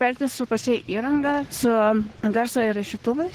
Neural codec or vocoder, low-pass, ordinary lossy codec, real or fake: codec, 44.1 kHz, 3.4 kbps, Pupu-Codec; 14.4 kHz; Opus, 16 kbps; fake